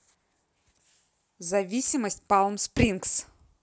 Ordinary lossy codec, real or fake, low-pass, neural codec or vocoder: none; real; none; none